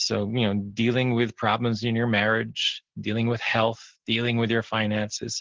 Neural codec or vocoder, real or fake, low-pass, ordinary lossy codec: none; real; 7.2 kHz; Opus, 16 kbps